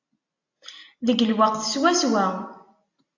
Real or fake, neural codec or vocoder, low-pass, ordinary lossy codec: real; none; 7.2 kHz; AAC, 48 kbps